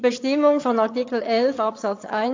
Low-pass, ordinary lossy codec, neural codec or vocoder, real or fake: 7.2 kHz; AAC, 48 kbps; vocoder, 22.05 kHz, 80 mel bands, HiFi-GAN; fake